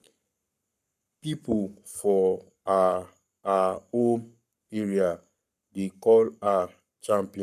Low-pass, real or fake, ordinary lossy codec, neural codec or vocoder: 14.4 kHz; fake; none; codec, 44.1 kHz, 7.8 kbps, Pupu-Codec